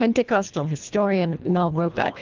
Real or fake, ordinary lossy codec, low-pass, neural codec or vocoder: fake; Opus, 32 kbps; 7.2 kHz; codec, 24 kHz, 1.5 kbps, HILCodec